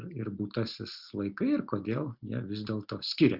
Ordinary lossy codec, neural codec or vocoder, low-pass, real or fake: Opus, 64 kbps; none; 5.4 kHz; real